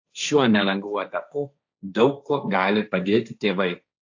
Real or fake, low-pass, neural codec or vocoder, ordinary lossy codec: fake; 7.2 kHz; codec, 16 kHz, 1.1 kbps, Voila-Tokenizer; AAC, 48 kbps